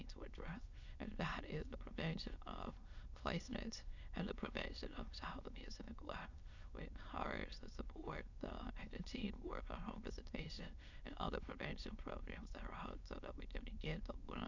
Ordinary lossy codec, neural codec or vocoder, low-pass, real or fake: Opus, 64 kbps; autoencoder, 22.05 kHz, a latent of 192 numbers a frame, VITS, trained on many speakers; 7.2 kHz; fake